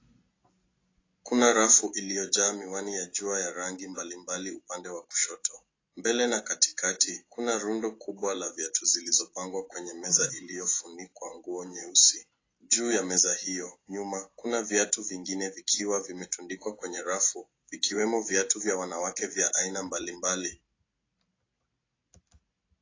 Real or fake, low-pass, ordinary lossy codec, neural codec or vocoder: real; 7.2 kHz; AAC, 32 kbps; none